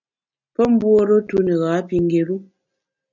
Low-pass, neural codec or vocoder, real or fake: 7.2 kHz; none; real